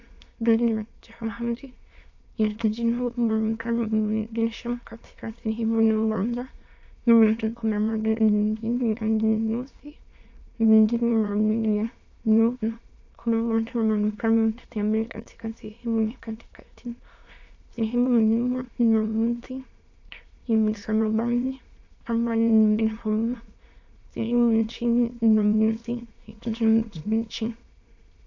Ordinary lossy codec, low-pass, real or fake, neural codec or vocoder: AAC, 48 kbps; 7.2 kHz; fake; autoencoder, 22.05 kHz, a latent of 192 numbers a frame, VITS, trained on many speakers